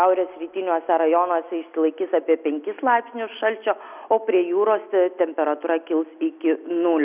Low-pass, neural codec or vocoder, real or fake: 3.6 kHz; none; real